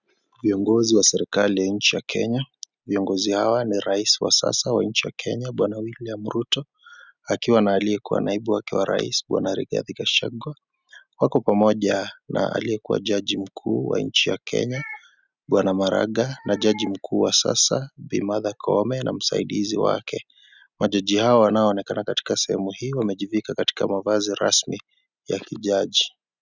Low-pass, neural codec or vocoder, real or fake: 7.2 kHz; none; real